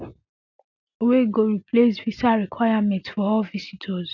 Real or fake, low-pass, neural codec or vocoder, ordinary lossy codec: real; 7.2 kHz; none; none